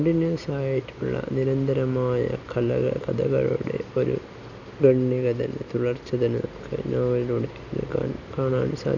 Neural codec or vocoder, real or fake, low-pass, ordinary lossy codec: none; real; 7.2 kHz; none